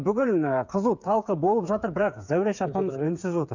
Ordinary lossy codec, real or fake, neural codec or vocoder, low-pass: none; fake; codec, 16 kHz, 8 kbps, FreqCodec, smaller model; 7.2 kHz